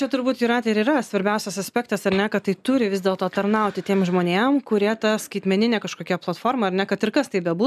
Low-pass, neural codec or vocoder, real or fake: 14.4 kHz; none; real